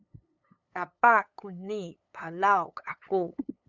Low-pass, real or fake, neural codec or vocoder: 7.2 kHz; fake; codec, 16 kHz, 8 kbps, FunCodec, trained on LibriTTS, 25 frames a second